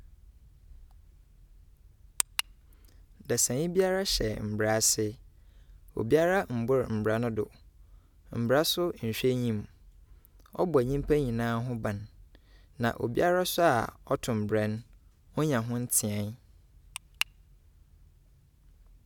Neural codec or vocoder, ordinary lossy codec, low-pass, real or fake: none; none; 19.8 kHz; real